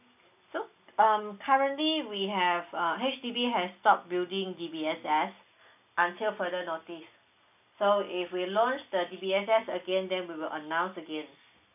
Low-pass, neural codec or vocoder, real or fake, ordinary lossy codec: 3.6 kHz; none; real; none